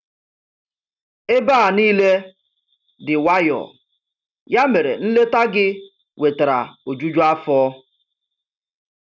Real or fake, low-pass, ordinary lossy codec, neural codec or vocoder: real; 7.2 kHz; none; none